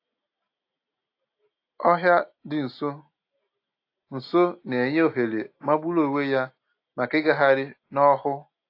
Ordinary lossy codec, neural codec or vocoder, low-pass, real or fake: AAC, 32 kbps; none; 5.4 kHz; real